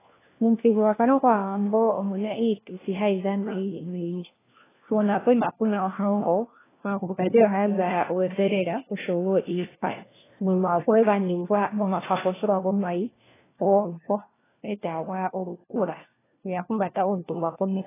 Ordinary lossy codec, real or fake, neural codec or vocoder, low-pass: AAC, 16 kbps; fake; codec, 16 kHz, 1 kbps, FunCodec, trained on LibriTTS, 50 frames a second; 3.6 kHz